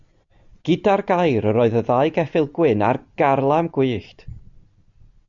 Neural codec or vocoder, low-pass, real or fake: none; 7.2 kHz; real